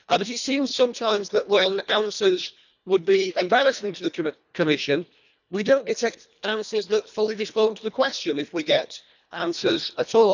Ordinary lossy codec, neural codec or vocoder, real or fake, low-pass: none; codec, 24 kHz, 1.5 kbps, HILCodec; fake; 7.2 kHz